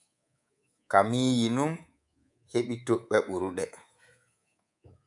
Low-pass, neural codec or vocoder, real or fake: 10.8 kHz; codec, 24 kHz, 3.1 kbps, DualCodec; fake